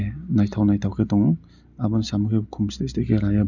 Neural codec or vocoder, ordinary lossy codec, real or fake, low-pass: none; none; real; 7.2 kHz